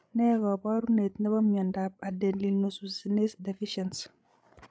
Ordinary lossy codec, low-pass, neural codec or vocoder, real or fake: none; none; codec, 16 kHz, 16 kbps, FreqCodec, larger model; fake